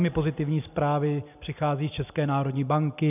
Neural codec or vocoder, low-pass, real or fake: none; 3.6 kHz; real